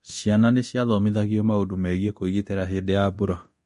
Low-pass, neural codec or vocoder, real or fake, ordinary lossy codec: 10.8 kHz; codec, 24 kHz, 0.9 kbps, DualCodec; fake; MP3, 48 kbps